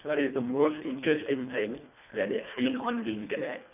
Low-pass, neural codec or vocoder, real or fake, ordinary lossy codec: 3.6 kHz; codec, 24 kHz, 1.5 kbps, HILCodec; fake; none